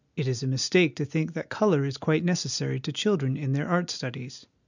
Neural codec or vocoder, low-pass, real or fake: none; 7.2 kHz; real